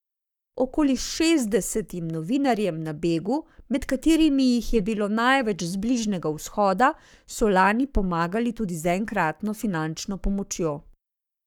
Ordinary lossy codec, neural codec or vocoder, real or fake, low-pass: none; codec, 44.1 kHz, 7.8 kbps, Pupu-Codec; fake; 19.8 kHz